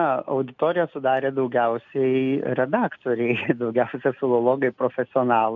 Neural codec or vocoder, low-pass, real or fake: none; 7.2 kHz; real